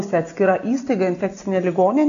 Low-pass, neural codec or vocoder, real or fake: 7.2 kHz; none; real